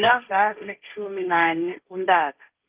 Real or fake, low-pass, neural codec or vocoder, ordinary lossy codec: fake; 3.6 kHz; codec, 16 kHz, 1.1 kbps, Voila-Tokenizer; Opus, 32 kbps